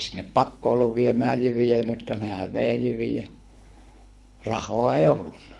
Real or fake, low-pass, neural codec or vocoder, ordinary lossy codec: fake; none; codec, 24 kHz, 3 kbps, HILCodec; none